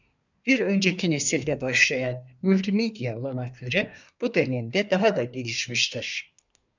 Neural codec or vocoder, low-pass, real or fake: codec, 24 kHz, 1 kbps, SNAC; 7.2 kHz; fake